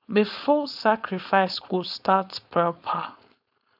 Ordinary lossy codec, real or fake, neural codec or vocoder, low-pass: none; fake; codec, 16 kHz, 4.8 kbps, FACodec; 5.4 kHz